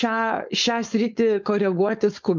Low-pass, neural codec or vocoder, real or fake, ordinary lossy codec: 7.2 kHz; codec, 16 kHz, 4.8 kbps, FACodec; fake; MP3, 64 kbps